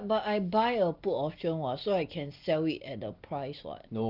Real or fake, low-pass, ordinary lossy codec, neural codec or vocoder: real; 5.4 kHz; Opus, 32 kbps; none